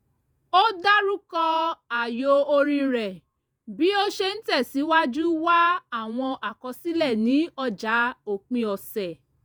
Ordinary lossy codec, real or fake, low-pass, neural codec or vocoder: none; fake; none; vocoder, 48 kHz, 128 mel bands, Vocos